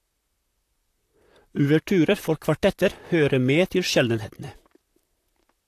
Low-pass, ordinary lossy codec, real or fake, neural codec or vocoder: 14.4 kHz; AAC, 64 kbps; fake; vocoder, 44.1 kHz, 128 mel bands, Pupu-Vocoder